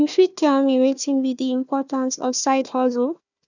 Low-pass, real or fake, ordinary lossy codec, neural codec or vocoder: 7.2 kHz; fake; none; codec, 16 kHz, 1 kbps, FunCodec, trained on Chinese and English, 50 frames a second